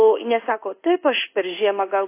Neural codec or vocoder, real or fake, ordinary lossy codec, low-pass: codec, 16 kHz in and 24 kHz out, 1 kbps, XY-Tokenizer; fake; AAC, 24 kbps; 3.6 kHz